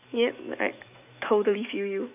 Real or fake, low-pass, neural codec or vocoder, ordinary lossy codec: fake; 3.6 kHz; autoencoder, 48 kHz, 128 numbers a frame, DAC-VAE, trained on Japanese speech; AAC, 32 kbps